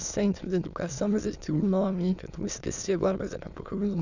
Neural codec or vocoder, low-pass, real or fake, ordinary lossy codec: autoencoder, 22.05 kHz, a latent of 192 numbers a frame, VITS, trained on many speakers; 7.2 kHz; fake; none